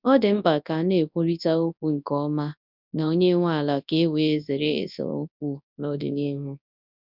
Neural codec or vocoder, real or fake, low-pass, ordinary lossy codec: codec, 24 kHz, 0.9 kbps, WavTokenizer, large speech release; fake; 5.4 kHz; none